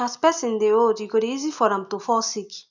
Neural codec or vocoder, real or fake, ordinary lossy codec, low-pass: none; real; none; 7.2 kHz